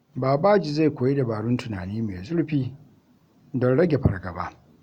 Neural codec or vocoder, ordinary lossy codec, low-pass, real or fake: none; Opus, 64 kbps; 19.8 kHz; real